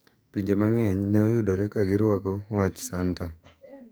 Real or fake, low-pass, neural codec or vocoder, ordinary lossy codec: fake; none; codec, 44.1 kHz, 2.6 kbps, SNAC; none